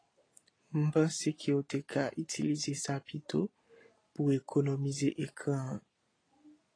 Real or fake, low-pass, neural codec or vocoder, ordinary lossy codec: real; 9.9 kHz; none; AAC, 32 kbps